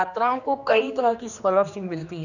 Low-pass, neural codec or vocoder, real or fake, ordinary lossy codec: 7.2 kHz; codec, 16 kHz, 2 kbps, X-Codec, HuBERT features, trained on general audio; fake; none